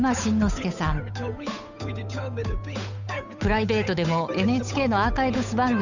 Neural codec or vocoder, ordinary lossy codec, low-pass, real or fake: codec, 16 kHz, 8 kbps, FunCodec, trained on Chinese and English, 25 frames a second; none; 7.2 kHz; fake